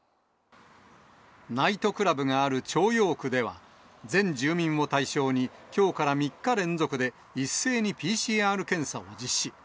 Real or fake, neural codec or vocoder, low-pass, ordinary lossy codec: real; none; none; none